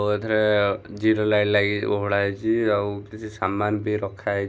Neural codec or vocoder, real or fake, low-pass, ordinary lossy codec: none; real; none; none